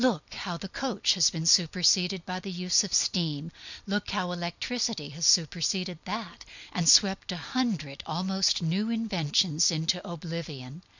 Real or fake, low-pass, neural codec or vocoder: real; 7.2 kHz; none